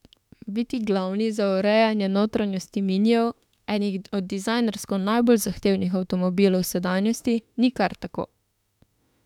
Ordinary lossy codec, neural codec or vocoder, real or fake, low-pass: none; autoencoder, 48 kHz, 32 numbers a frame, DAC-VAE, trained on Japanese speech; fake; 19.8 kHz